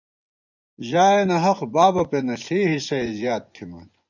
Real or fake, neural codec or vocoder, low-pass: fake; vocoder, 24 kHz, 100 mel bands, Vocos; 7.2 kHz